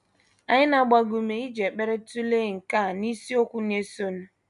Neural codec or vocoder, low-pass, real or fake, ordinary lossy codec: none; 10.8 kHz; real; none